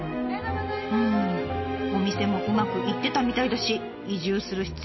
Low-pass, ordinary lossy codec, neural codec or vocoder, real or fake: 7.2 kHz; MP3, 24 kbps; none; real